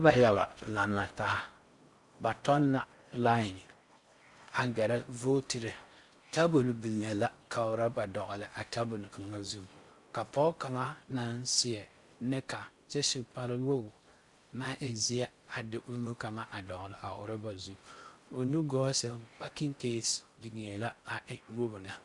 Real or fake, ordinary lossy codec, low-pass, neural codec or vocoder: fake; Opus, 64 kbps; 10.8 kHz; codec, 16 kHz in and 24 kHz out, 0.6 kbps, FocalCodec, streaming, 4096 codes